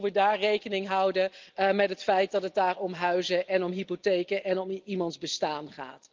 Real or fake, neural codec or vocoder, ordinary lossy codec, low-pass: real; none; Opus, 32 kbps; 7.2 kHz